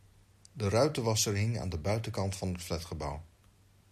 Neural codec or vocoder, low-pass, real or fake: none; 14.4 kHz; real